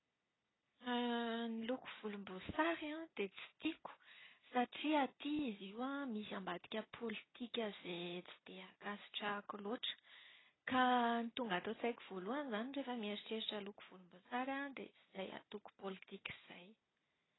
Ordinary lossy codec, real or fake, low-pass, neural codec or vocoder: AAC, 16 kbps; real; 7.2 kHz; none